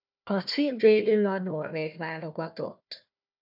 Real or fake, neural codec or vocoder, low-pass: fake; codec, 16 kHz, 1 kbps, FunCodec, trained on Chinese and English, 50 frames a second; 5.4 kHz